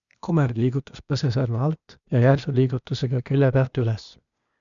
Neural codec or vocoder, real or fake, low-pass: codec, 16 kHz, 0.8 kbps, ZipCodec; fake; 7.2 kHz